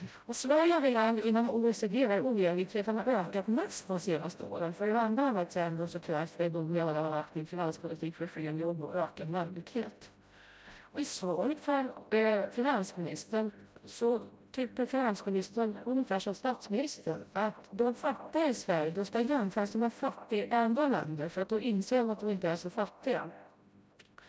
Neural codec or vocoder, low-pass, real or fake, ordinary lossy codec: codec, 16 kHz, 0.5 kbps, FreqCodec, smaller model; none; fake; none